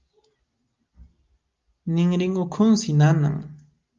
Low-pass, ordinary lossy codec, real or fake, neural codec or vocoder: 7.2 kHz; Opus, 32 kbps; real; none